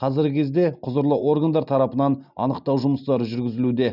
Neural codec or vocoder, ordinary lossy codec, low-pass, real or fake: none; none; 5.4 kHz; real